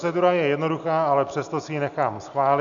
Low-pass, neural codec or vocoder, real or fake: 7.2 kHz; none; real